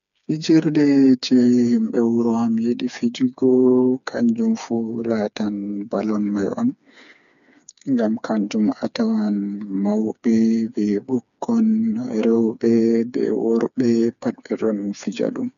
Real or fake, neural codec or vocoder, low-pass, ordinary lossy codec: fake; codec, 16 kHz, 4 kbps, FreqCodec, smaller model; 7.2 kHz; none